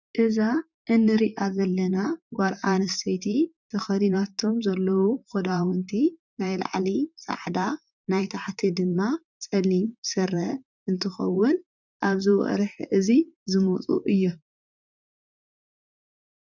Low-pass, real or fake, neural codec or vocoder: 7.2 kHz; fake; vocoder, 44.1 kHz, 128 mel bands, Pupu-Vocoder